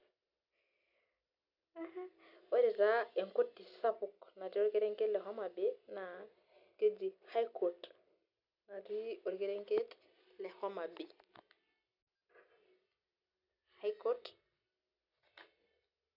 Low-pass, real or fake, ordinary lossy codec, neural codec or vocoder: 5.4 kHz; real; none; none